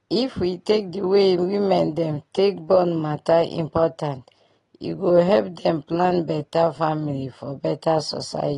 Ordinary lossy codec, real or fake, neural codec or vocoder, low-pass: AAC, 32 kbps; real; none; 10.8 kHz